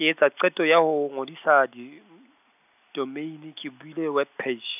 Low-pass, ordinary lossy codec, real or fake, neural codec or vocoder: 3.6 kHz; none; real; none